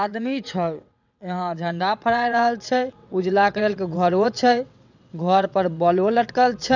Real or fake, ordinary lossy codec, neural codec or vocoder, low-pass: fake; none; vocoder, 22.05 kHz, 80 mel bands, WaveNeXt; 7.2 kHz